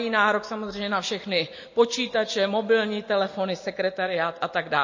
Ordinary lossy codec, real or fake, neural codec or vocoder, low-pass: MP3, 32 kbps; real; none; 7.2 kHz